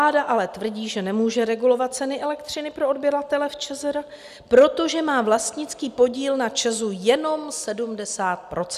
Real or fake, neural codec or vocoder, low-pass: real; none; 14.4 kHz